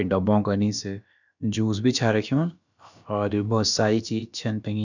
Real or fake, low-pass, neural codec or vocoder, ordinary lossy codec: fake; 7.2 kHz; codec, 16 kHz, about 1 kbps, DyCAST, with the encoder's durations; none